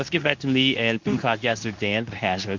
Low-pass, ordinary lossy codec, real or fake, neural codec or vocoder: 7.2 kHz; MP3, 64 kbps; fake; codec, 24 kHz, 0.9 kbps, WavTokenizer, medium speech release version 2